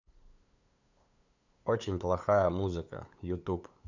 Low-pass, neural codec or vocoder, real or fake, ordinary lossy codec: 7.2 kHz; codec, 16 kHz, 8 kbps, FunCodec, trained on LibriTTS, 25 frames a second; fake; none